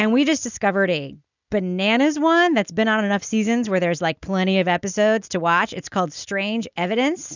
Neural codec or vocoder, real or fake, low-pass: none; real; 7.2 kHz